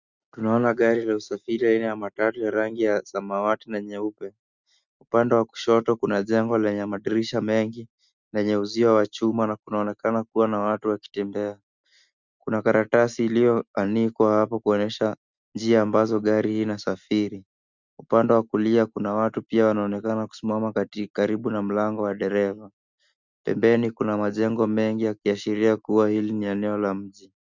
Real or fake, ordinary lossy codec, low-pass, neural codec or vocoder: real; Opus, 64 kbps; 7.2 kHz; none